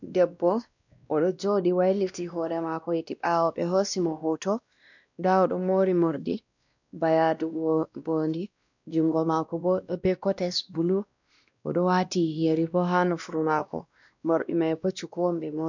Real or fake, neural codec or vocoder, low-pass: fake; codec, 16 kHz, 1 kbps, X-Codec, WavLM features, trained on Multilingual LibriSpeech; 7.2 kHz